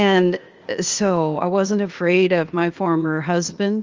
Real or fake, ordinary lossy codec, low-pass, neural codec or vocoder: fake; Opus, 32 kbps; 7.2 kHz; codec, 16 kHz, 0.8 kbps, ZipCodec